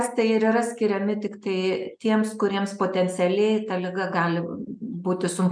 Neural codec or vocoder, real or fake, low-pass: none; real; 9.9 kHz